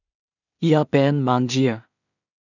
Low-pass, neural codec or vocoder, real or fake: 7.2 kHz; codec, 16 kHz in and 24 kHz out, 0.4 kbps, LongCat-Audio-Codec, two codebook decoder; fake